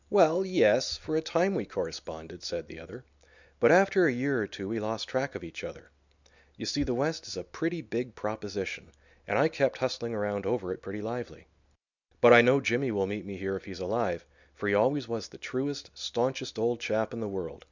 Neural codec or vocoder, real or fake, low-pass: none; real; 7.2 kHz